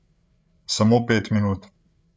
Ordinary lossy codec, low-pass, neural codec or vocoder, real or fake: none; none; codec, 16 kHz, 8 kbps, FreqCodec, larger model; fake